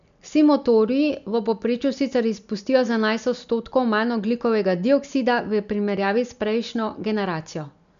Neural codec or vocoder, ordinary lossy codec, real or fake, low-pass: none; none; real; 7.2 kHz